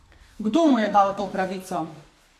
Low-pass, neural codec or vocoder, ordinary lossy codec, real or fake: 14.4 kHz; codec, 44.1 kHz, 2.6 kbps, SNAC; AAC, 64 kbps; fake